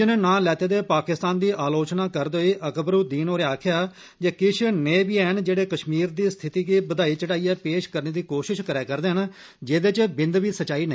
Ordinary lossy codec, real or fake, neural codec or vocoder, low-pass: none; real; none; none